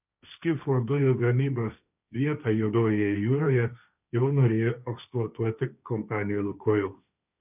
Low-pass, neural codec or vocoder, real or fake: 3.6 kHz; codec, 16 kHz, 1.1 kbps, Voila-Tokenizer; fake